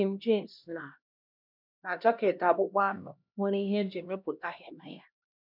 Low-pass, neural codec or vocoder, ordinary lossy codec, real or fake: 5.4 kHz; codec, 16 kHz, 1 kbps, X-Codec, HuBERT features, trained on LibriSpeech; none; fake